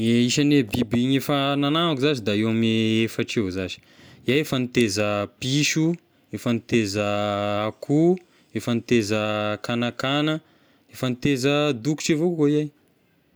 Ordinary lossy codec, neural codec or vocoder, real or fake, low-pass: none; none; real; none